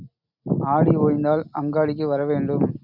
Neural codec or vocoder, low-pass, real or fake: none; 5.4 kHz; real